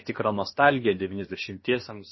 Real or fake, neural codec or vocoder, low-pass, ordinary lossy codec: fake; codec, 16 kHz, about 1 kbps, DyCAST, with the encoder's durations; 7.2 kHz; MP3, 24 kbps